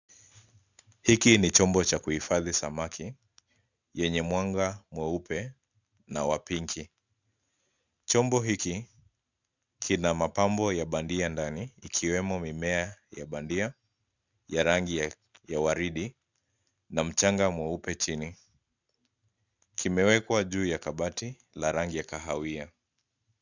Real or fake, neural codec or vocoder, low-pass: real; none; 7.2 kHz